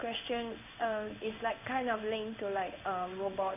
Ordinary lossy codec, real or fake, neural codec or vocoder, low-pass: none; fake; codec, 16 kHz in and 24 kHz out, 1 kbps, XY-Tokenizer; 3.6 kHz